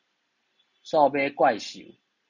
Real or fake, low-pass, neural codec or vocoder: real; 7.2 kHz; none